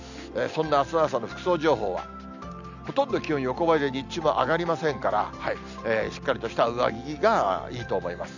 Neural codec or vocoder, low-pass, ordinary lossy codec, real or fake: none; 7.2 kHz; none; real